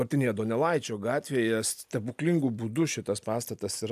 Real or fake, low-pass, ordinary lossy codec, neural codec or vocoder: real; 14.4 kHz; AAC, 96 kbps; none